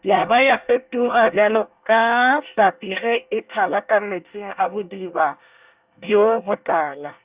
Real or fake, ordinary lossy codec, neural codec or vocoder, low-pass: fake; Opus, 64 kbps; codec, 24 kHz, 1 kbps, SNAC; 3.6 kHz